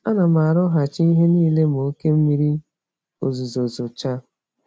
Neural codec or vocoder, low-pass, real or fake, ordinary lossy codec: none; none; real; none